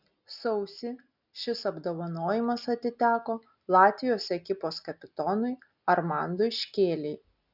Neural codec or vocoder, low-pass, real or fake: none; 5.4 kHz; real